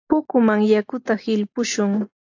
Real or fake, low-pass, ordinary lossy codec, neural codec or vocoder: real; 7.2 kHz; AAC, 48 kbps; none